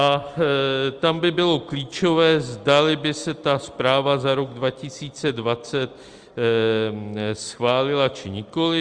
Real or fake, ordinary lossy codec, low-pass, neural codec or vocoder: real; Opus, 32 kbps; 9.9 kHz; none